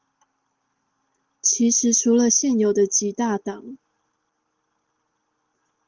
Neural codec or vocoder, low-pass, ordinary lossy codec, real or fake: none; 7.2 kHz; Opus, 16 kbps; real